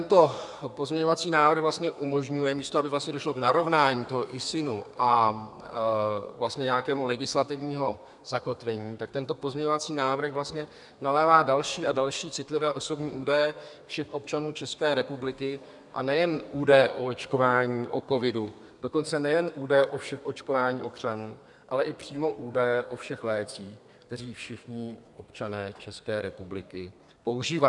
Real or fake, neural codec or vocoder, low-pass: fake; codec, 32 kHz, 1.9 kbps, SNAC; 10.8 kHz